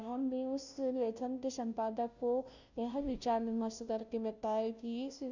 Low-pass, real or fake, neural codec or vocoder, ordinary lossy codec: 7.2 kHz; fake; codec, 16 kHz, 0.5 kbps, FunCodec, trained on Chinese and English, 25 frames a second; none